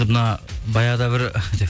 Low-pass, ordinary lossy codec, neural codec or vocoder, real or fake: none; none; none; real